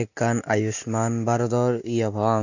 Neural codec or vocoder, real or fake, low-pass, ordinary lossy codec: none; real; 7.2 kHz; none